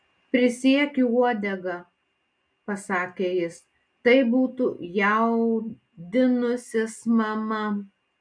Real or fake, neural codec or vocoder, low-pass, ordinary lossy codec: real; none; 9.9 kHz; MP3, 64 kbps